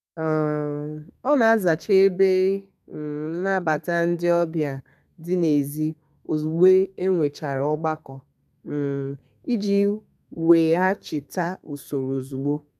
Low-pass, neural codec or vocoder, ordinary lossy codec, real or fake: 14.4 kHz; codec, 32 kHz, 1.9 kbps, SNAC; none; fake